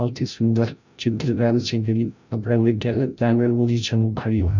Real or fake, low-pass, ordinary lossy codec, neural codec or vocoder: fake; 7.2 kHz; none; codec, 16 kHz, 0.5 kbps, FreqCodec, larger model